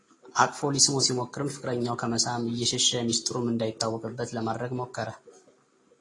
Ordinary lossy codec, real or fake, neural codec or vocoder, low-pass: AAC, 32 kbps; real; none; 10.8 kHz